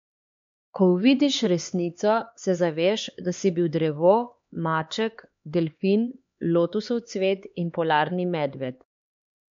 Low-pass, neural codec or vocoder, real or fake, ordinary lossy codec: 7.2 kHz; codec, 16 kHz, 4 kbps, X-Codec, HuBERT features, trained on LibriSpeech; fake; MP3, 48 kbps